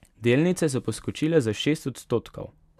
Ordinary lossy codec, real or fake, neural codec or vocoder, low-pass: none; real; none; 14.4 kHz